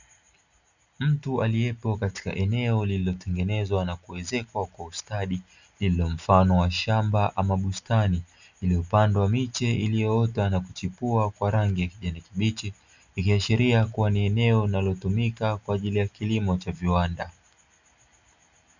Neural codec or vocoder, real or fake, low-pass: none; real; 7.2 kHz